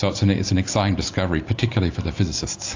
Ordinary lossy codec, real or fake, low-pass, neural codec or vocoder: AAC, 48 kbps; real; 7.2 kHz; none